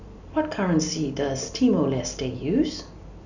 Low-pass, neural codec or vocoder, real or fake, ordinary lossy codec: 7.2 kHz; none; real; none